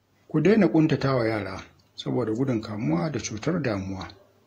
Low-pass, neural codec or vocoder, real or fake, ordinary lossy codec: 19.8 kHz; vocoder, 48 kHz, 128 mel bands, Vocos; fake; AAC, 48 kbps